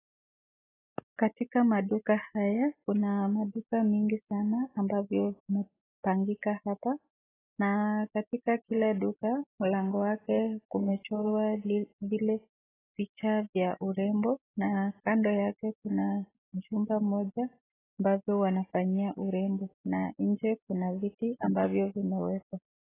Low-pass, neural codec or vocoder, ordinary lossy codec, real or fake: 3.6 kHz; none; AAC, 16 kbps; real